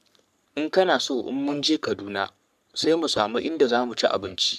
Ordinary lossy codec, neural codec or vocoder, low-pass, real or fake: none; codec, 44.1 kHz, 3.4 kbps, Pupu-Codec; 14.4 kHz; fake